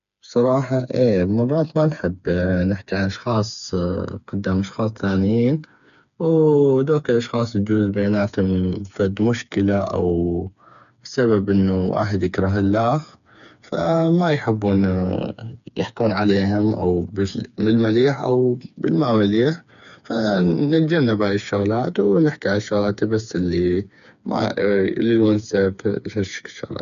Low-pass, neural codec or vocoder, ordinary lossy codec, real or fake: 7.2 kHz; codec, 16 kHz, 4 kbps, FreqCodec, smaller model; none; fake